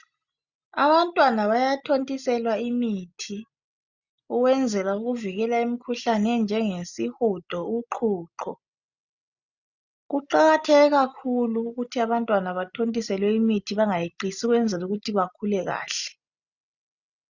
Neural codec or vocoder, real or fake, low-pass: none; real; 7.2 kHz